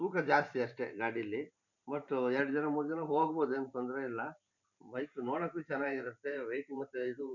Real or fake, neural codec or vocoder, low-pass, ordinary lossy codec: fake; vocoder, 44.1 kHz, 128 mel bands every 512 samples, BigVGAN v2; 7.2 kHz; none